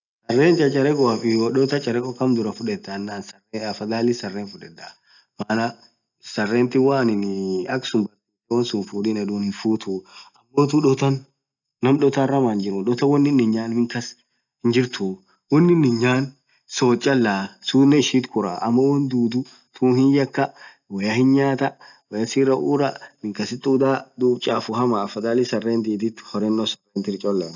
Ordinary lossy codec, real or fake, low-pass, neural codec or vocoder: none; real; 7.2 kHz; none